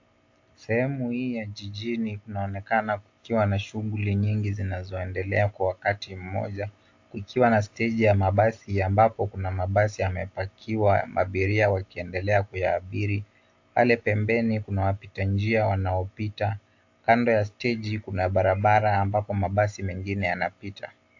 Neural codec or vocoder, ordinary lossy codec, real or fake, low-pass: none; AAC, 48 kbps; real; 7.2 kHz